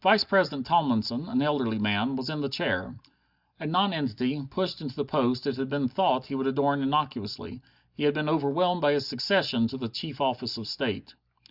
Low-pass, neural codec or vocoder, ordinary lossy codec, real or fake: 5.4 kHz; none; Opus, 64 kbps; real